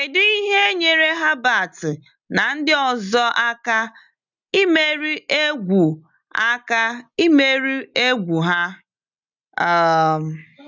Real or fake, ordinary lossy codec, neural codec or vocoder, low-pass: real; none; none; 7.2 kHz